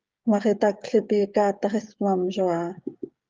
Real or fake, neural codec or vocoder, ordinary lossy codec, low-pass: fake; codec, 16 kHz, 16 kbps, FreqCodec, smaller model; Opus, 24 kbps; 7.2 kHz